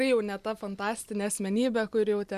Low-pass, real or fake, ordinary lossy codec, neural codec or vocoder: 14.4 kHz; real; MP3, 96 kbps; none